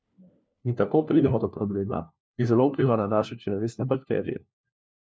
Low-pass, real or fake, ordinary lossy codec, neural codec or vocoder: none; fake; none; codec, 16 kHz, 1 kbps, FunCodec, trained on LibriTTS, 50 frames a second